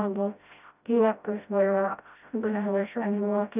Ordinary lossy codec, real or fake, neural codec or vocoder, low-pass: none; fake; codec, 16 kHz, 0.5 kbps, FreqCodec, smaller model; 3.6 kHz